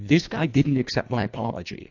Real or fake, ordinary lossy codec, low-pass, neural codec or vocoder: fake; AAC, 48 kbps; 7.2 kHz; codec, 24 kHz, 1.5 kbps, HILCodec